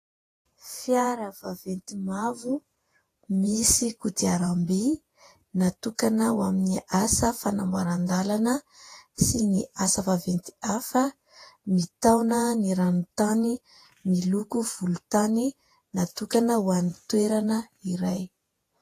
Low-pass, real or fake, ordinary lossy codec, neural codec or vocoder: 14.4 kHz; fake; AAC, 48 kbps; vocoder, 48 kHz, 128 mel bands, Vocos